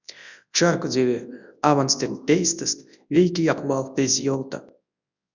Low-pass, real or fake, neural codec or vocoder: 7.2 kHz; fake; codec, 24 kHz, 0.9 kbps, WavTokenizer, large speech release